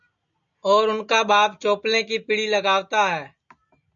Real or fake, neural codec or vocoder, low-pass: real; none; 7.2 kHz